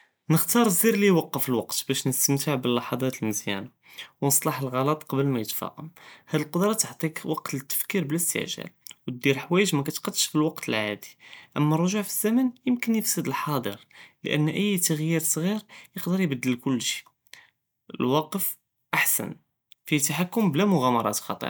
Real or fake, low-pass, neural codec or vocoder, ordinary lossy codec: real; none; none; none